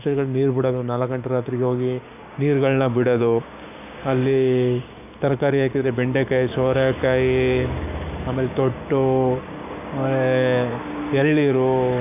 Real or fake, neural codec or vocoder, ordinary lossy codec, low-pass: fake; codec, 16 kHz, 6 kbps, DAC; none; 3.6 kHz